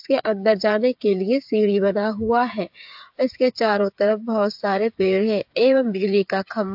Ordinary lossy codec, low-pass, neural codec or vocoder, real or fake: AAC, 48 kbps; 5.4 kHz; codec, 16 kHz, 8 kbps, FreqCodec, smaller model; fake